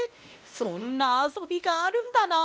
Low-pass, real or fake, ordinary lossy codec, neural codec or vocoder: none; fake; none; codec, 16 kHz, 1 kbps, X-Codec, WavLM features, trained on Multilingual LibriSpeech